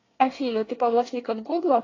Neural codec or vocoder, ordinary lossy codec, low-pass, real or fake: codec, 24 kHz, 1 kbps, SNAC; AAC, 32 kbps; 7.2 kHz; fake